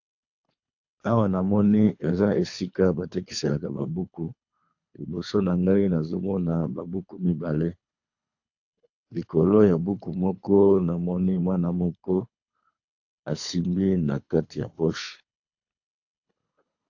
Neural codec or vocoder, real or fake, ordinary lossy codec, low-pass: codec, 24 kHz, 3 kbps, HILCodec; fake; AAC, 48 kbps; 7.2 kHz